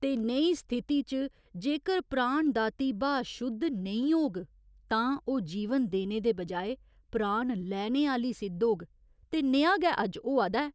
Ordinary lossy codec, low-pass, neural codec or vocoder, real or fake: none; none; none; real